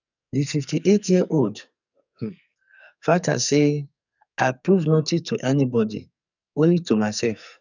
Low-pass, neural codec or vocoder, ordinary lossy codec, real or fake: 7.2 kHz; codec, 44.1 kHz, 2.6 kbps, SNAC; none; fake